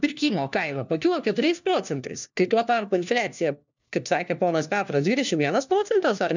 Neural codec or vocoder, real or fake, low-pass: codec, 16 kHz, 1 kbps, FunCodec, trained on LibriTTS, 50 frames a second; fake; 7.2 kHz